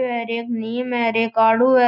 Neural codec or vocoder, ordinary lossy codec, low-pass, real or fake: none; none; 5.4 kHz; real